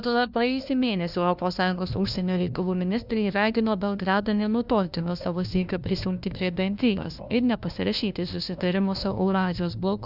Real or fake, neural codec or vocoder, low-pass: fake; codec, 16 kHz, 1 kbps, FunCodec, trained on LibriTTS, 50 frames a second; 5.4 kHz